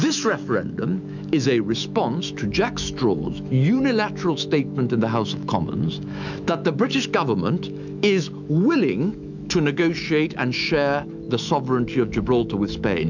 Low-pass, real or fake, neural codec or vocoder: 7.2 kHz; fake; autoencoder, 48 kHz, 128 numbers a frame, DAC-VAE, trained on Japanese speech